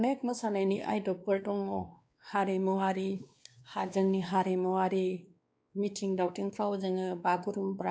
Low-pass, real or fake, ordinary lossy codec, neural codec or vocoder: none; fake; none; codec, 16 kHz, 2 kbps, X-Codec, WavLM features, trained on Multilingual LibriSpeech